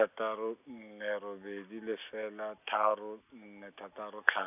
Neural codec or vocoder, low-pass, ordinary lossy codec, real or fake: none; 3.6 kHz; none; real